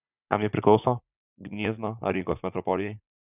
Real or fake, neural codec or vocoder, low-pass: fake; vocoder, 44.1 kHz, 80 mel bands, Vocos; 3.6 kHz